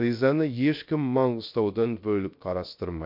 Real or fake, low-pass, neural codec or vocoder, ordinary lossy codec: fake; 5.4 kHz; codec, 16 kHz, 0.3 kbps, FocalCodec; MP3, 48 kbps